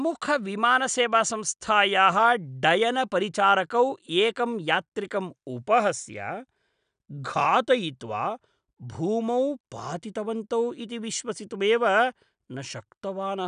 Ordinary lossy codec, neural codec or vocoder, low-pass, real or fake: none; none; 9.9 kHz; real